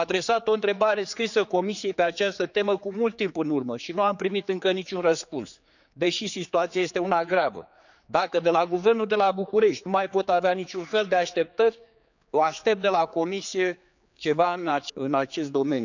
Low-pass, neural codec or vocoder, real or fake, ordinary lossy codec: 7.2 kHz; codec, 16 kHz, 4 kbps, X-Codec, HuBERT features, trained on general audio; fake; none